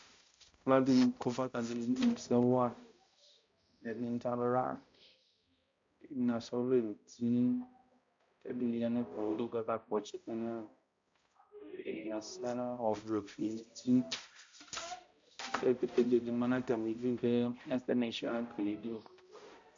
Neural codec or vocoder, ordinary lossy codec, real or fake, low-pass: codec, 16 kHz, 0.5 kbps, X-Codec, HuBERT features, trained on balanced general audio; MP3, 48 kbps; fake; 7.2 kHz